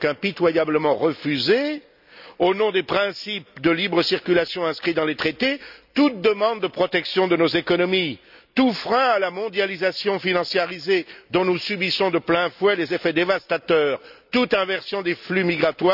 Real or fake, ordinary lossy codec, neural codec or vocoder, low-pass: real; none; none; 5.4 kHz